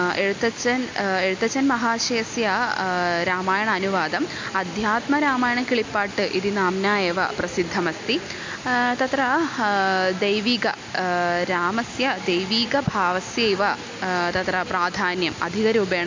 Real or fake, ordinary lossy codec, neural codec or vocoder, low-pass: real; MP3, 64 kbps; none; 7.2 kHz